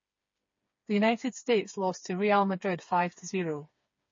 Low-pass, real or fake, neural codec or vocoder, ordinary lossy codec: 7.2 kHz; fake; codec, 16 kHz, 4 kbps, FreqCodec, smaller model; MP3, 32 kbps